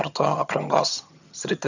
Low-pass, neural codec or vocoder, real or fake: 7.2 kHz; vocoder, 22.05 kHz, 80 mel bands, HiFi-GAN; fake